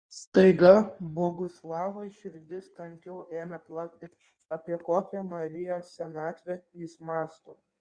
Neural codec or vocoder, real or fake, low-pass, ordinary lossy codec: codec, 16 kHz in and 24 kHz out, 1.1 kbps, FireRedTTS-2 codec; fake; 9.9 kHz; Opus, 64 kbps